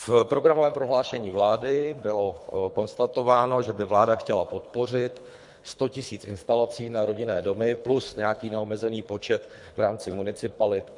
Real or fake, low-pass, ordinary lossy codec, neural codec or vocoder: fake; 10.8 kHz; MP3, 64 kbps; codec, 24 kHz, 3 kbps, HILCodec